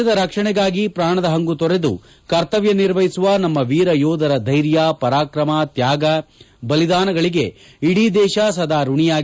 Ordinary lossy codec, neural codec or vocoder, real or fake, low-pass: none; none; real; none